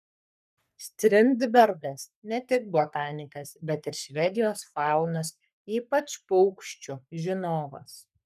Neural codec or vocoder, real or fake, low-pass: codec, 44.1 kHz, 3.4 kbps, Pupu-Codec; fake; 14.4 kHz